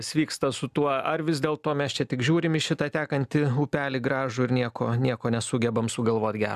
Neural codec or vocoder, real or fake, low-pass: none; real; 14.4 kHz